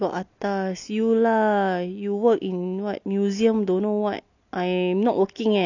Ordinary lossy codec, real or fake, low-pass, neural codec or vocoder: none; real; 7.2 kHz; none